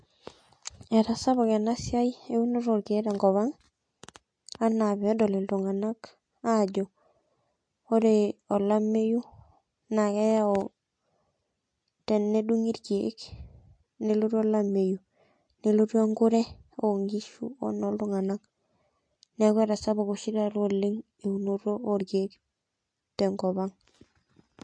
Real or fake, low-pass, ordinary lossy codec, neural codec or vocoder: real; 9.9 kHz; MP3, 48 kbps; none